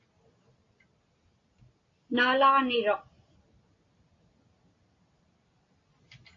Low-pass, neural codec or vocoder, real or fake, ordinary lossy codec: 7.2 kHz; none; real; AAC, 32 kbps